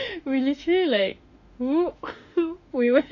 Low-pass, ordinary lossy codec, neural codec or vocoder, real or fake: 7.2 kHz; none; autoencoder, 48 kHz, 32 numbers a frame, DAC-VAE, trained on Japanese speech; fake